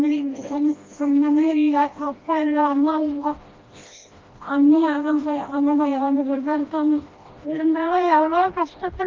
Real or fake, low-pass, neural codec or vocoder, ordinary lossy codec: fake; 7.2 kHz; codec, 16 kHz, 1 kbps, FreqCodec, smaller model; Opus, 32 kbps